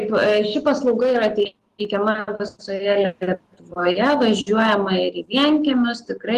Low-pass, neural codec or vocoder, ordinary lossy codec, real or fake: 14.4 kHz; none; Opus, 16 kbps; real